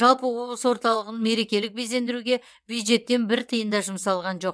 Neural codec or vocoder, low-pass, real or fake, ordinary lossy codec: vocoder, 22.05 kHz, 80 mel bands, WaveNeXt; none; fake; none